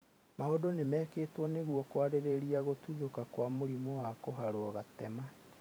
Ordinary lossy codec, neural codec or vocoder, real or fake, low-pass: none; vocoder, 44.1 kHz, 128 mel bands every 512 samples, BigVGAN v2; fake; none